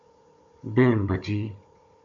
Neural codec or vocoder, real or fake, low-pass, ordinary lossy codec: codec, 16 kHz, 16 kbps, FunCodec, trained on Chinese and English, 50 frames a second; fake; 7.2 kHz; MP3, 48 kbps